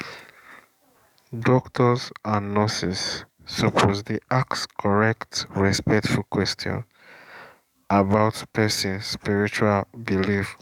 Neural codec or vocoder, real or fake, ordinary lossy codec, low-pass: codec, 44.1 kHz, 7.8 kbps, DAC; fake; none; 19.8 kHz